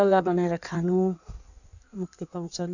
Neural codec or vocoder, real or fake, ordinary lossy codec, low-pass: codec, 16 kHz in and 24 kHz out, 1.1 kbps, FireRedTTS-2 codec; fake; none; 7.2 kHz